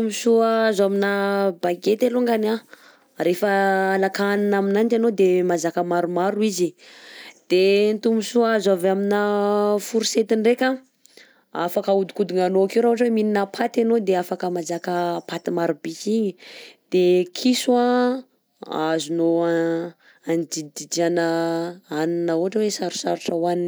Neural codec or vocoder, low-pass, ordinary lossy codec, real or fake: none; none; none; real